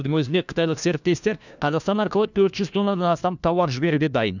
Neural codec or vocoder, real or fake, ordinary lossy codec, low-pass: codec, 16 kHz, 1 kbps, FunCodec, trained on LibriTTS, 50 frames a second; fake; none; 7.2 kHz